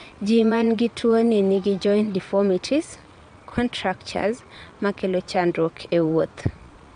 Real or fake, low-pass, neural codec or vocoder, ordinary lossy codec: fake; 9.9 kHz; vocoder, 22.05 kHz, 80 mel bands, Vocos; none